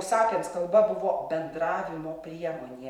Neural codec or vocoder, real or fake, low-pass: vocoder, 44.1 kHz, 128 mel bands every 512 samples, BigVGAN v2; fake; 19.8 kHz